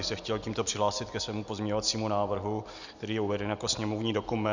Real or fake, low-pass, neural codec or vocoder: real; 7.2 kHz; none